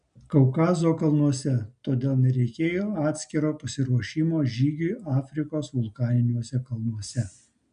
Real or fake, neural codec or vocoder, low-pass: real; none; 9.9 kHz